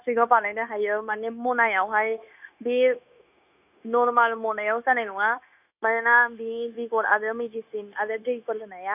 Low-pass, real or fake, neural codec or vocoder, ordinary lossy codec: 3.6 kHz; fake; codec, 16 kHz, 0.9 kbps, LongCat-Audio-Codec; none